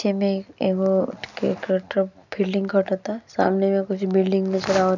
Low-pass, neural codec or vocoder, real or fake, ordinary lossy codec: 7.2 kHz; none; real; none